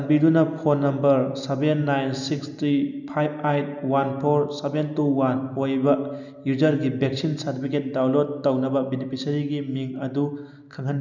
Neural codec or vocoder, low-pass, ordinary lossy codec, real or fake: none; 7.2 kHz; none; real